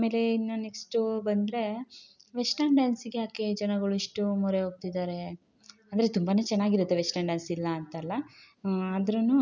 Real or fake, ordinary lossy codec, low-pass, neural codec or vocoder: real; none; 7.2 kHz; none